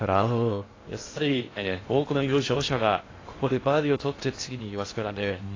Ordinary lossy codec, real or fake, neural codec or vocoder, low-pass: AAC, 32 kbps; fake; codec, 16 kHz in and 24 kHz out, 0.6 kbps, FocalCodec, streaming, 2048 codes; 7.2 kHz